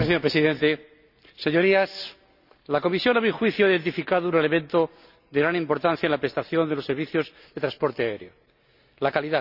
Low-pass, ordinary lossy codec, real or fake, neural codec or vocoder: 5.4 kHz; none; real; none